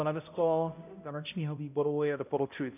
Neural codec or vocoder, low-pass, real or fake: codec, 16 kHz, 0.5 kbps, X-Codec, HuBERT features, trained on balanced general audio; 3.6 kHz; fake